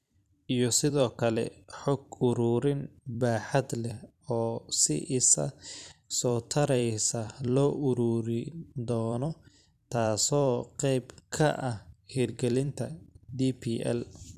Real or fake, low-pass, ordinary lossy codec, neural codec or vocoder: fake; 14.4 kHz; none; vocoder, 44.1 kHz, 128 mel bands every 256 samples, BigVGAN v2